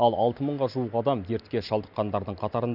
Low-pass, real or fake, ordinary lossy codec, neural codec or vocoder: 5.4 kHz; real; none; none